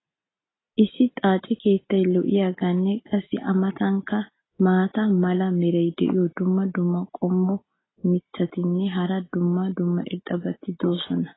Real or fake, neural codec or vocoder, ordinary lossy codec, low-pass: real; none; AAC, 16 kbps; 7.2 kHz